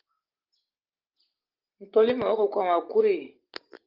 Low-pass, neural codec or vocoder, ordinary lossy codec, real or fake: 5.4 kHz; none; Opus, 32 kbps; real